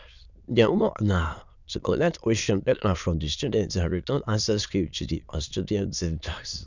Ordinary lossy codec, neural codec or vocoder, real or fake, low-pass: none; autoencoder, 22.05 kHz, a latent of 192 numbers a frame, VITS, trained on many speakers; fake; 7.2 kHz